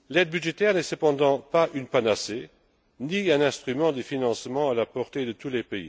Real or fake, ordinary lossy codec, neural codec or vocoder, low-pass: real; none; none; none